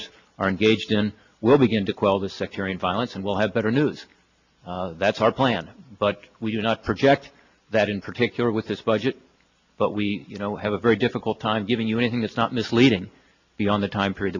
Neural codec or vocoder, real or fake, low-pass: none; real; 7.2 kHz